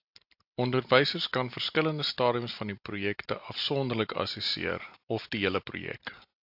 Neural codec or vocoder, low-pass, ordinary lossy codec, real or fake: none; 5.4 kHz; AAC, 48 kbps; real